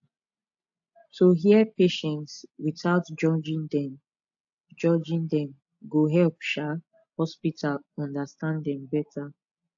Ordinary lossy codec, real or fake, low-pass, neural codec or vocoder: AAC, 64 kbps; real; 7.2 kHz; none